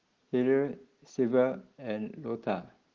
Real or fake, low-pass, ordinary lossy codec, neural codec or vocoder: real; 7.2 kHz; Opus, 16 kbps; none